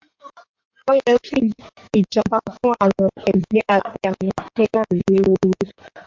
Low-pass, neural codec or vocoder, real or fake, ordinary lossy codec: 7.2 kHz; codec, 16 kHz in and 24 kHz out, 2.2 kbps, FireRedTTS-2 codec; fake; MP3, 64 kbps